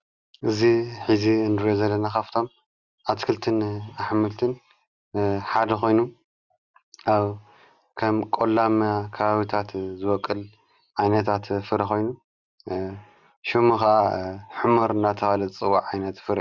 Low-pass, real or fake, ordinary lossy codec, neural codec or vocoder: 7.2 kHz; real; Opus, 64 kbps; none